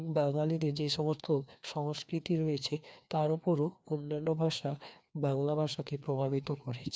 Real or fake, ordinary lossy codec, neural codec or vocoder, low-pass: fake; none; codec, 16 kHz, 2 kbps, FreqCodec, larger model; none